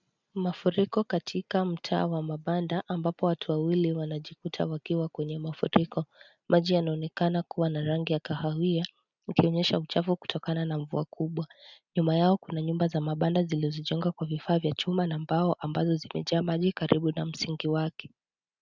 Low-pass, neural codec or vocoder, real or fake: 7.2 kHz; none; real